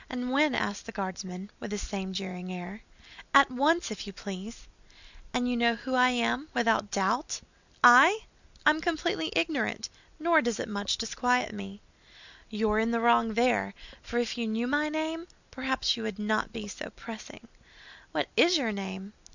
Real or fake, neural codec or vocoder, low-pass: real; none; 7.2 kHz